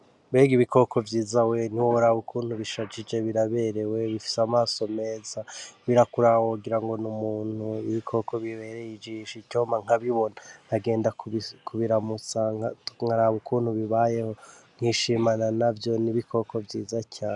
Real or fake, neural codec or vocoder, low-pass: real; none; 10.8 kHz